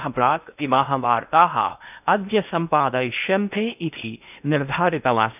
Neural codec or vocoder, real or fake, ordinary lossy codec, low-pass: codec, 16 kHz in and 24 kHz out, 0.8 kbps, FocalCodec, streaming, 65536 codes; fake; none; 3.6 kHz